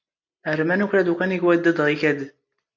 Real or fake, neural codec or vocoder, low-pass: real; none; 7.2 kHz